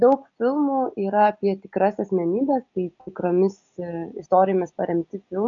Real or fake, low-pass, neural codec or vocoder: real; 7.2 kHz; none